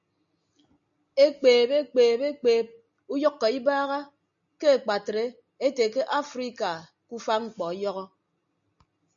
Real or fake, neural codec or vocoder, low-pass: real; none; 7.2 kHz